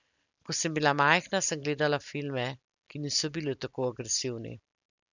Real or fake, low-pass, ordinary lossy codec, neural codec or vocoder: real; 7.2 kHz; none; none